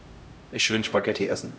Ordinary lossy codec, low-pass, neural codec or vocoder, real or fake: none; none; codec, 16 kHz, 0.5 kbps, X-Codec, HuBERT features, trained on LibriSpeech; fake